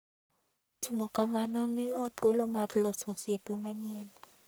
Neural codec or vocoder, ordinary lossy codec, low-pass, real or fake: codec, 44.1 kHz, 1.7 kbps, Pupu-Codec; none; none; fake